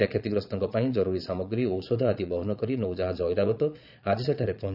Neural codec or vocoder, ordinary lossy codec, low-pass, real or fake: vocoder, 22.05 kHz, 80 mel bands, Vocos; none; 5.4 kHz; fake